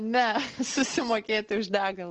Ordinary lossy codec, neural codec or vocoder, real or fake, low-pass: Opus, 16 kbps; none; real; 7.2 kHz